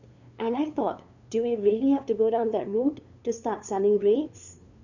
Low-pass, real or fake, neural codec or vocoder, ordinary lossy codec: 7.2 kHz; fake; codec, 16 kHz, 2 kbps, FunCodec, trained on LibriTTS, 25 frames a second; none